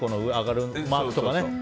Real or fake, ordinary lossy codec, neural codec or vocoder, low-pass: real; none; none; none